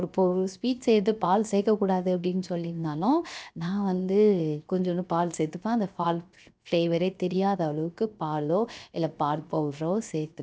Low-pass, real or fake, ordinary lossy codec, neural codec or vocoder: none; fake; none; codec, 16 kHz, 0.7 kbps, FocalCodec